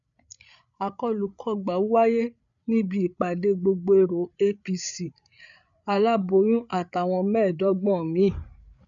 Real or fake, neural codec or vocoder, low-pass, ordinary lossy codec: fake; codec, 16 kHz, 8 kbps, FreqCodec, larger model; 7.2 kHz; none